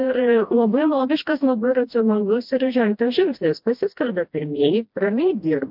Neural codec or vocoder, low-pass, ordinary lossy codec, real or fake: codec, 16 kHz, 1 kbps, FreqCodec, smaller model; 5.4 kHz; MP3, 48 kbps; fake